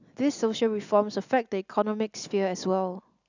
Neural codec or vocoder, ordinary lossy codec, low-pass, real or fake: none; none; 7.2 kHz; real